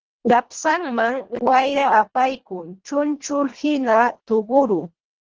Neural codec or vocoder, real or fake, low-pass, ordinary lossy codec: codec, 24 kHz, 1.5 kbps, HILCodec; fake; 7.2 kHz; Opus, 16 kbps